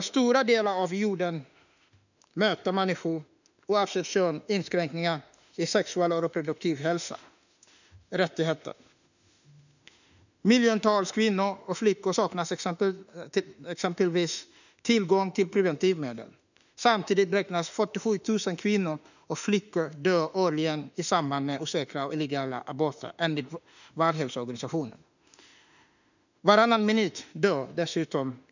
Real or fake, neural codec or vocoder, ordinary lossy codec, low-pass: fake; autoencoder, 48 kHz, 32 numbers a frame, DAC-VAE, trained on Japanese speech; none; 7.2 kHz